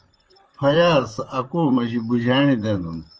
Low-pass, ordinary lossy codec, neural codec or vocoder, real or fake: 7.2 kHz; Opus, 24 kbps; vocoder, 44.1 kHz, 128 mel bands, Pupu-Vocoder; fake